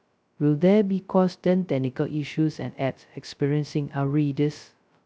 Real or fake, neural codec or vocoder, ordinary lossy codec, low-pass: fake; codec, 16 kHz, 0.2 kbps, FocalCodec; none; none